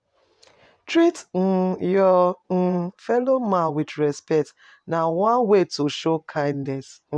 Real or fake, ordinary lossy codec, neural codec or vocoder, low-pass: fake; none; vocoder, 24 kHz, 100 mel bands, Vocos; 9.9 kHz